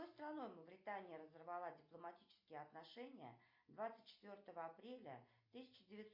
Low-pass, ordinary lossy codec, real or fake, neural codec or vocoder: 5.4 kHz; MP3, 32 kbps; real; none